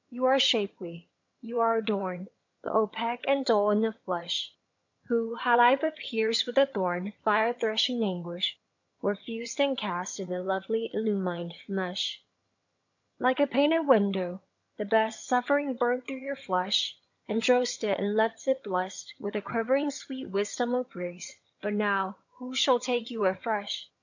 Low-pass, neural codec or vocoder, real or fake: 7.2 kHz; vocoder, 22.05 kHz, 80 mel bands, HiFi-GAN; fake